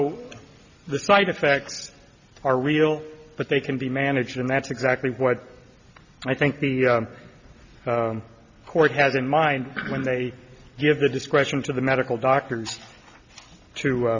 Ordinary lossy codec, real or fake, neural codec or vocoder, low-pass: Opus, 64 kbps; real; none; 7.2 kHz